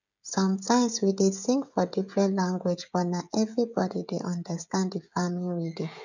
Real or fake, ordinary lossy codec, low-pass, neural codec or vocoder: fake; none; 7.2 kHz; codec, 16 kHz, 16 kbps, FreqCodec, smaller model